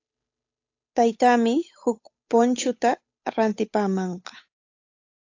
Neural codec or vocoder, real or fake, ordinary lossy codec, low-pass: codec, 16 kHz, 8 kbps, FunCodec, trained on Chinese and English, 25 frames a second; fake; AAC, 48 kbps; 7.2 kHz